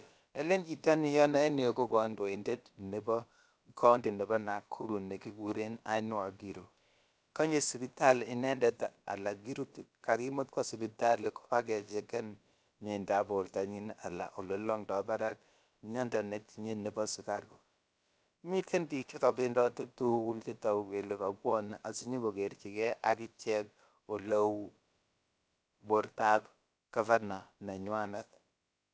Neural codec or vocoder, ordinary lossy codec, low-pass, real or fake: codec, 16 kHz, about 1 kbps, DyCAST, with the encoder's durations; none; none; fake